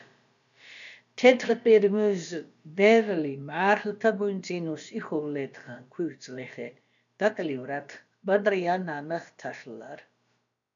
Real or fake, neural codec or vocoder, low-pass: fake; codec, 16 kHz, about 1 kbps, DyCAST, with the encoder's durations; 7.2 kHz